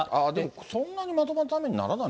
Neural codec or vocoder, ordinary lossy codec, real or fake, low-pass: none; none; real; none